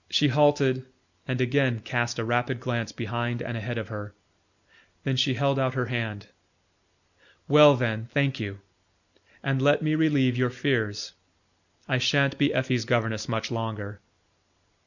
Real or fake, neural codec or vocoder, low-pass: real; none; 7.2 kHz